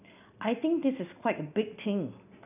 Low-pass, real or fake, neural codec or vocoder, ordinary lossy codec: 3.6 kHz; real; none; none